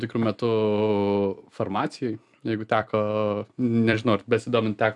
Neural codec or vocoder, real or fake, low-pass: vocoder, 44.1 kHz, 128 mel bands every 256 samples, BigVGAN v2; fake; 10.8 kHz